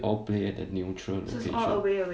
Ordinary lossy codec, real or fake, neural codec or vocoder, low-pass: none; real; none; none